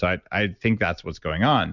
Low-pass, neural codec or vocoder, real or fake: 7.2 kHz; none; real